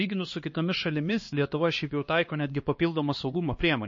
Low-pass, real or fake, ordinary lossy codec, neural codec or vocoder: 7.2 kHz; fake; MP3, 32 kbps; codec, 16 kHz, 2 kbps, X-Codec, HuBERT features, trained on LibriSpeech